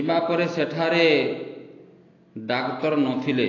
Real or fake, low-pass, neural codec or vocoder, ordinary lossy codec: real; 7.2 kHz; none; AAC, 32 kbps